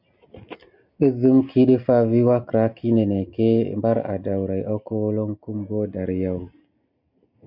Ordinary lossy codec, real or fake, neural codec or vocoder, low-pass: MP3, 48 kbps; real; none; 5.4 kHz